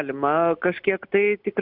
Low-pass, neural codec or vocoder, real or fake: 5.4 kHz; none; real